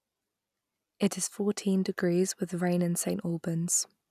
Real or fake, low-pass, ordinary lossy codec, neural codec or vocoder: real; 14.4 kHz; none; none